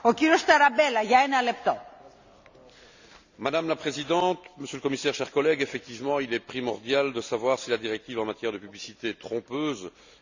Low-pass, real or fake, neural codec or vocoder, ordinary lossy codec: 7.2 kHz; real; none; none